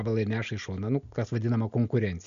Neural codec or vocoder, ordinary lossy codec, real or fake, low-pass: none; MP3, 96 kbps; real; 7.2 kHz